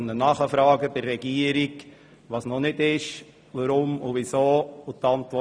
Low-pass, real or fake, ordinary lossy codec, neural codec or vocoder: none; real; none; none